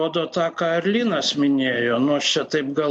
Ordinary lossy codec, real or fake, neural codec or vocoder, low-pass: MP3, 48 kbps; real; none; 10.8 kHz